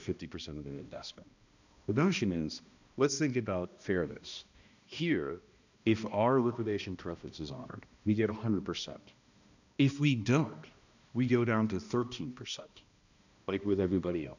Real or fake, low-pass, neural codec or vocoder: fake; 7.2 kHz; codec, 16 kHz, 1 kbps, X-Codec, HuBERT features, trained on balanced general audio